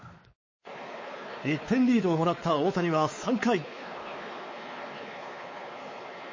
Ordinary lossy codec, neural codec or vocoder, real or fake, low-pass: MP3, 32 kbps; codec, 16 kHz, 4 kbps, X-Codec, WavLM features, trained on Multilingual LibriSpeech; fake; 7.2 kHz